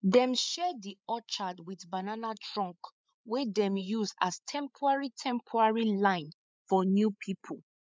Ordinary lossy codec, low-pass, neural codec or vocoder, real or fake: none; none; codec, 16 kHz, 8 kbps, FreqCodec, larger model; fake